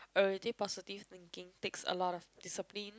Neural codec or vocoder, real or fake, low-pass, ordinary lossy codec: none; real; none; none